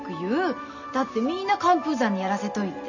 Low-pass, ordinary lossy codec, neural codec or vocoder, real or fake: 7.2 kHz; none; none; real